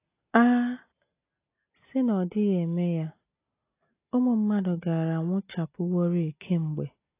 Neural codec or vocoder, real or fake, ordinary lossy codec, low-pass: none; real; AAC, 24 kbps; 3.6 kHz